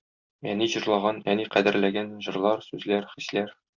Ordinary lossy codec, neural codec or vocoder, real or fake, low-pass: Opus, 64 kbps; none; real; 7.2 kHz